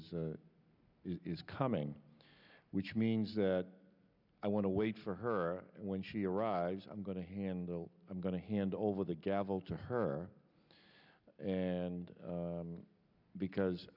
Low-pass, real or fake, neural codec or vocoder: 5.4 kHz; real; none